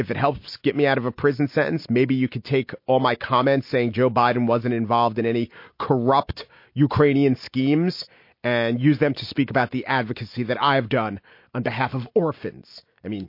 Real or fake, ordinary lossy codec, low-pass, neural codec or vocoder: real; MP3, 32 kbps; 5.4 kHz; none